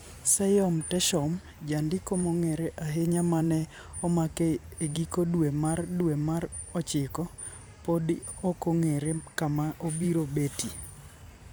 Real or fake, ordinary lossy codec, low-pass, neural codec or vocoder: fake; none; none; vocoder, 44.1 kHz, 128 mel bands every 512 samples, BigVGAN v2